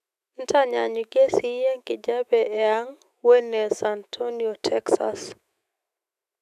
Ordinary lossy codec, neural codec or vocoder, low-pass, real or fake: none; autoencoder, 48 kHz, 128 numbers a frame, DAC-VAE, trained on Japanese speech; 14.4 kHz; fake